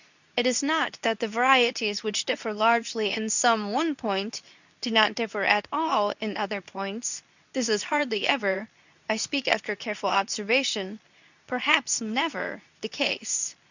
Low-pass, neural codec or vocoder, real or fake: 7.2 kHz; codec, 24 kHz, 0.9 kbps, WavTokenizer, medium speech release version 2; fake